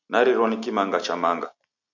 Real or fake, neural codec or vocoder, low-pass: real; none; 7.2 kHz